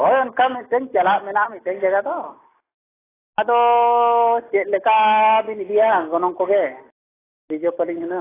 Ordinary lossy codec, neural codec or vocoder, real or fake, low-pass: AAC, 16 kbps; none; real; 3.6 kHz